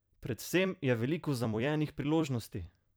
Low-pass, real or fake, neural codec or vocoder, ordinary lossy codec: none; fake; vocoder, 44.1 kHz, 128 mel bands every 256 samples, BigVGAN v2; none